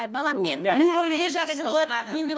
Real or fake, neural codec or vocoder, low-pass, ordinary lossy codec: fake; codec, 16 kHz, 1 kbps, FunCodec, trained on LibriTTS, 50 frames a second; none; none